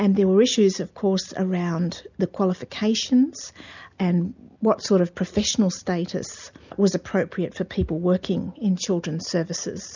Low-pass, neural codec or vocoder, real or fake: 7.2 kHz; none; real